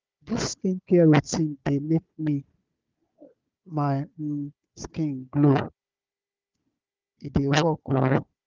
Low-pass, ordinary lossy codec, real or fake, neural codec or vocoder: 7.2 kHz; Opus, 24 kbps; fake; codec, 16 kHz, 4 kbps, FunCodec, trained on Chinese and English, 50 frames a second